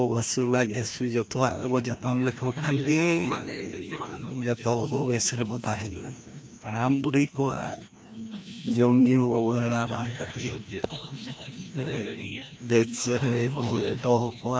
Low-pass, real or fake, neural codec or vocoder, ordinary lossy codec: none; fake; codec, 16 kHz, 1 kbps, FreqCodec, larger model; none